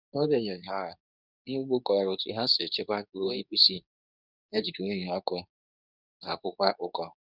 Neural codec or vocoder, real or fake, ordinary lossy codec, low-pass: codec, 24 kHz, 0.9 kbps, WavTokenizer, medium speech release version 2; fake; none; 5.4 kHz